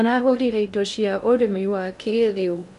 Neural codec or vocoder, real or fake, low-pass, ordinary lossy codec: codec, 16 kHz in and 24 kHz out, 0.6 kbps, FocalCodec, streaming, 2048 codes; fake; 10.8 kHz; none